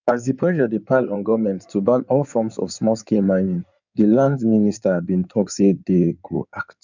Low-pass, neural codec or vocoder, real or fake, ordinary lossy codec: 7.2 kHz; codec, 16 kHz in and 24 kHz out, 2.2 kbps, FireRedTTS-2 codec; fake; none